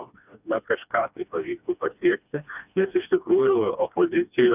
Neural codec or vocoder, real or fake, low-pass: codec, 16 kHz, 2 kbps, FreqCodec, smaller model; fake; 3.6 kHz